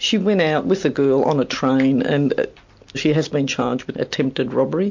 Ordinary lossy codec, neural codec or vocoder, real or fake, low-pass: MP3, 48 kbps; none; real; 7.2 kHz